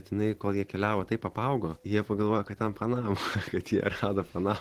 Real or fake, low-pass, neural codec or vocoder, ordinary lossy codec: fake; 14.4 kHz; vocoder, 44.1 kHz, 128 mel bands every 512 samples, BigVGAN v2; Opus, 16 kbps